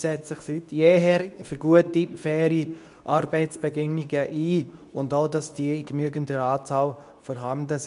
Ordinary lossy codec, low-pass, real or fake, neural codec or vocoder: none; 10.8 kHz; fake; codec, 24 kHz, 0.9 kbps, WavTokenizer, medium speech release version 2